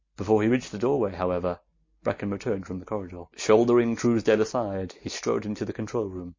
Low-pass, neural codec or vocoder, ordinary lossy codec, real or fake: 7.2 kHz; none; MP3, 32 kbps; real